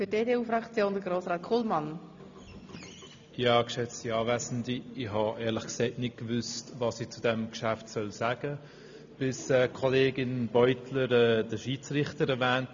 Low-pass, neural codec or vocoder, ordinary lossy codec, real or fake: 7.2 kHz; none; AAC, 64 kbps; real